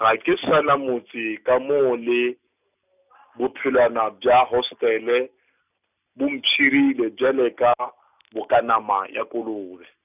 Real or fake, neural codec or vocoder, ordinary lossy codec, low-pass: real; none; none; 3.6 kHz